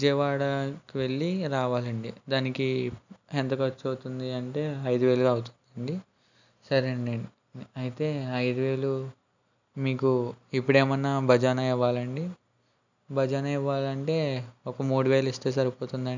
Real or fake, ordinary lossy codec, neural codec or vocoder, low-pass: real; none; none; 7.2 kHz